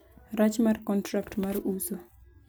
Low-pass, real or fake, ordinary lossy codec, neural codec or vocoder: none; real; none; none